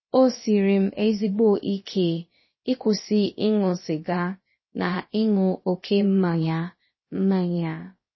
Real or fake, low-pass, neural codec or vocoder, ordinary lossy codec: fake; 7.2 kHz; codec, 16 kHz, about 1 kbps, DyCAST, with the encoder's durations; MP3, 24 kbps